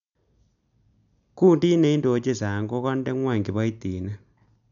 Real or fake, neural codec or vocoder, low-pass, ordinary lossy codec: real; none; 7.2 kHz; none